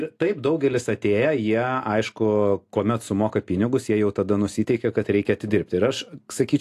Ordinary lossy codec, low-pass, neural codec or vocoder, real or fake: AAC, 64 kbps; 14.4 kHz; none; real